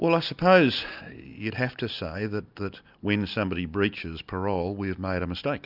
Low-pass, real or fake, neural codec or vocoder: 5.4 kHz; real; none